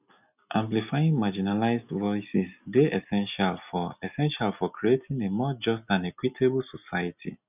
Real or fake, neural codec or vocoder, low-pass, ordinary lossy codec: real; none; 3.6 kHz; none